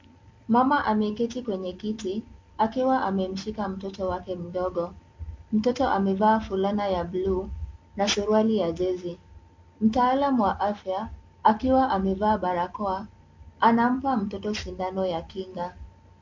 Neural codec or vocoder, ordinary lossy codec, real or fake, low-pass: none; MP3, 48 kbps; real; 7.2 kHz